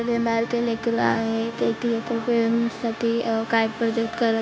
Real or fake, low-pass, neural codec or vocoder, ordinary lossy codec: fake; none; codec, 16 kHz, 0.9 kbps, LongCat-Audio-Codec; none